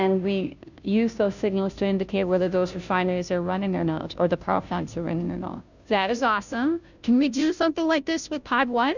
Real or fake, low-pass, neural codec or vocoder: fake; 7.2 kHz; codec, 16 kHz, 0.5 kbps, FunCodec, trained on Chinese and English, 25 frames a second